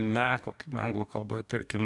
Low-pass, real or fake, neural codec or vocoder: 10.8 kHz; fake; codec, 44.1 kHz, 2.6 kbps, DAC